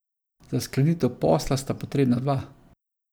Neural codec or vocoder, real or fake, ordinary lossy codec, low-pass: none; real; none; none